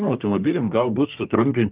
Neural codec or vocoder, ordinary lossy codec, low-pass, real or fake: codec, 32 kHz, 1.9 kbps, SNAC; Opus, 32 kbps; 3.6 kHz; fake